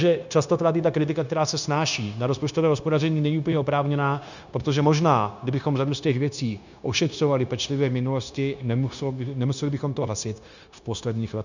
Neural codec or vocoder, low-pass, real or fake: codec, 16 kHz, 0.9 kbps, LongCat-Audio-Codec; 7.2 kHz; fake